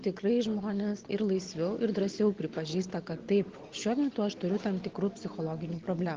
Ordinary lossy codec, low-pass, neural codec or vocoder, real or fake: Opus, 16 kbps; 7.2 kHz; none; real